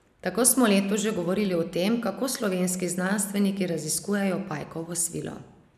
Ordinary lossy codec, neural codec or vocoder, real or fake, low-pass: none; none; real; 14.4 kHz